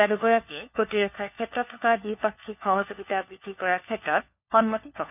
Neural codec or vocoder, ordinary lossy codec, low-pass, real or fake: codec, 16 kHz, 4 kbps, FunCodec, trained on LibriTTS, 50 frames a second; none; 3.6 kHz; fake